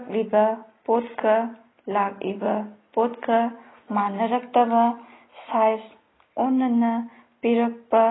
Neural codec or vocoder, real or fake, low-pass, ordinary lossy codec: none; real; 7.2 kHz; AAC, 16 kbps